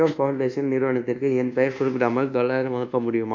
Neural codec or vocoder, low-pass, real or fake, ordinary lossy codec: codec, 24 kHz, 1.2 kbps, DualCodec; 7.2 kHz; fake; none